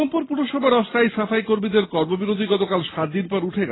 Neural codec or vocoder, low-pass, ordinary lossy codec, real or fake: none; 7.2 kHz; AAC, 16 kbps; real